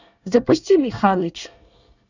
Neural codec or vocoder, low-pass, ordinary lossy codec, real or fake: codec, 24 kHz, 1 kbps, SNAC; 7.2 kHz; none; fake